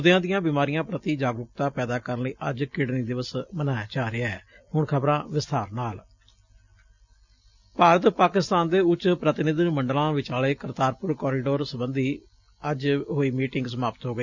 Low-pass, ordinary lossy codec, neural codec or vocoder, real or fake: 7.2 kHz; none; none; real